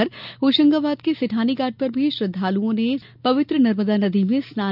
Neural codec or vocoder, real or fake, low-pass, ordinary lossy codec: none; real; 5.4 kHz; none